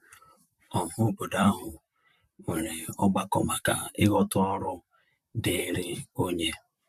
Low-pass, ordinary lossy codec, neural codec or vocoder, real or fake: 14.4 kHz; none; vocoder, 44.1 kHz, 128 mel bands, Pupu-Vocoder; fake